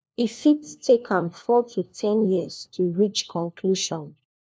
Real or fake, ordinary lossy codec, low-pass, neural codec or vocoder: fake; none; none; codec, 16 kHz, 1 kbps, FunCodec, trained on LibriTTS, 50 frames a second